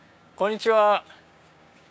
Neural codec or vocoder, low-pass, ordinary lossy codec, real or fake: codec, 16 kHz, 6 kbps, DAC; none; none; fake